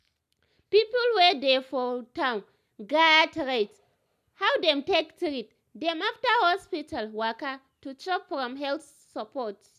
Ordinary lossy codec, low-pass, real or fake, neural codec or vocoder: none; 14.4 kHz; real; none